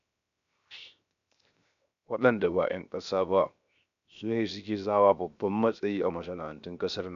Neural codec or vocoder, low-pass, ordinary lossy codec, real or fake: codec, 16 kHz, 0.7 kbps, FocalCodec; 7.2 kHz; MP3, 96 kbps; fake